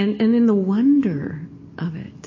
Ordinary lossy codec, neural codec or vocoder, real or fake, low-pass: MP3, 32 kbps; none; real; 7.2 kHz